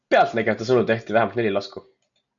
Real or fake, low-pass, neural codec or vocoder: real; 7.2 kHz; none